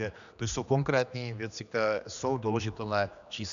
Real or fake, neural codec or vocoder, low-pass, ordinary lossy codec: fake; codec, 16 kHz, 2 kbps, X-Codec, HuBERT features, trained on general audio; 7.2 kHz; AAC, 96 kbps